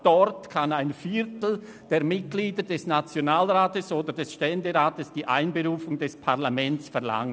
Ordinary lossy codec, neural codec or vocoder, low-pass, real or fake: none; none; none; real